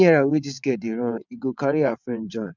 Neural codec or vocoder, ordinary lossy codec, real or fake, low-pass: vocoder, 22.05 kHz, 80 mel bands, WaveNeXt; none; fake; 7.2 kHz